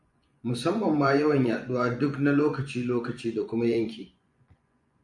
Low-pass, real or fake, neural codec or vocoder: 10.8 kHz; fake; vocoder, 24 kHz, 100 mel bands, Vocos